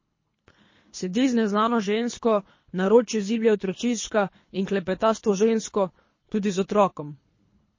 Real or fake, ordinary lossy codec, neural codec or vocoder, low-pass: fake; MP3, 32 kbps; codec, 24 kHz, 3 kbps, HILCodec; 7.2 kHz